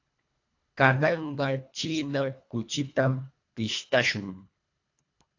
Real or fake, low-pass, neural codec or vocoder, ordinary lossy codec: fake; 7.2 kHz; codec, 24 kHz, 1.5 kbps, HILCodec; AAC, 48 kbps